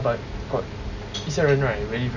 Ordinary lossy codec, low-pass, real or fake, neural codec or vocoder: none; 7.2 kHz; real; none